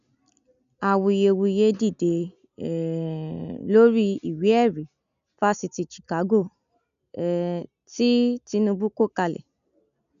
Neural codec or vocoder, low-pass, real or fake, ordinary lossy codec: none; 7.2 kHz; real; none